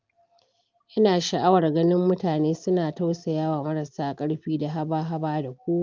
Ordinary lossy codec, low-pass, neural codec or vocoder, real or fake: Opus, 24 kbps; 7.2 kHz; none; real